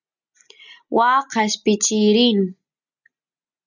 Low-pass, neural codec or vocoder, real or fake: 7.2 kHz; none; real